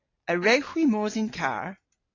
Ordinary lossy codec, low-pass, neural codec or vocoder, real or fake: AAC, 32 kbps; 7.2 kHz; none; real